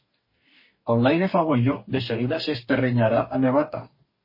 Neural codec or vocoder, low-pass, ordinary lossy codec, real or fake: codec, 44.1 kHz, 2.6 kbps, DAC; 5.4 kHz; MP3, 24 kbps; fake